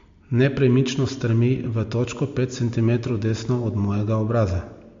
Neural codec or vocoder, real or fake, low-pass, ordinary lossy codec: none; real; 7.2 kHz; MP3, 48 kbps